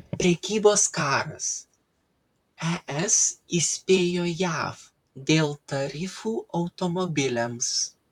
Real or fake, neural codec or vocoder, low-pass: fake; vocoder, 44.1 kHz, 128 mel bands, Pupu-Vocoder; 19.8 kHz